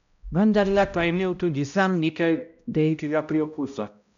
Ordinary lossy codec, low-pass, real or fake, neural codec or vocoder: none; 7.2 kHz; fake; codec, 16 kHz, 0.5 kbps, X-Codec, HuBERT features, trained on balanced general audio